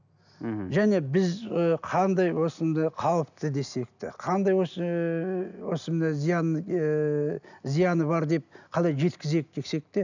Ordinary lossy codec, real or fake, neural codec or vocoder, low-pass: none; real; none; 7.2 kHz